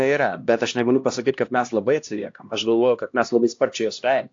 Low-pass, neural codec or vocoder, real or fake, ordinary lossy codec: 7.2 kHz; codec, 16 kHz, 1 kbps, X-Codec, HuBERT features, trained on LibriSpeech; fake; AAC, 48 kbps